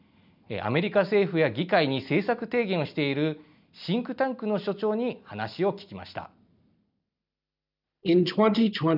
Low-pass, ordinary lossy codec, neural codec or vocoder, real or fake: 5.4 kHz; none; none; real